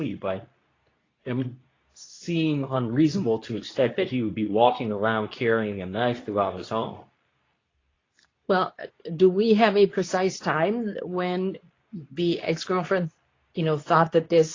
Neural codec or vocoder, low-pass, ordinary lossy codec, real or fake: codec, 24 kHz, 0.9 kbps, WavTokenizer, medium speech release version 2; 7.2 kHz; AAC, 32 kbps; fake